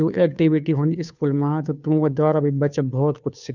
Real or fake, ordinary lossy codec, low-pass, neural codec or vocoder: fake; none; 7.2 kHz; codec, 16 kHz, 2 kbps, FunCodec, trained on Chinese and English, 25 frames a second